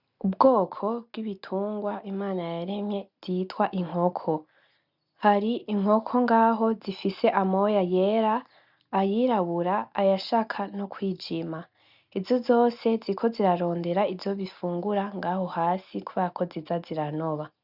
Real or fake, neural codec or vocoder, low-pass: real; none; 5.4 kHz